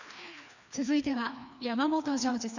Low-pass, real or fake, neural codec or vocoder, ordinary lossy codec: 7.2 kHz; fake; codec, 16 kHz, 2 kbps, FreqCodec, larger model; none